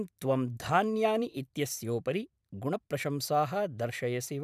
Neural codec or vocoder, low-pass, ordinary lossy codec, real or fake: vocoder, 44.1 kHz, 128 mel bands every 512 samples, BigVGAN v2; 14.4 kHz; none; fake